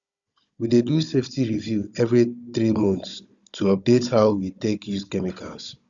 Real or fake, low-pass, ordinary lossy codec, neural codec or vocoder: fake; 7.2 kHz; Opus, 64 kbps; codec, 16 kHz, 16 kbps, FunCodec, trained on Chinese and English, 50 frames a second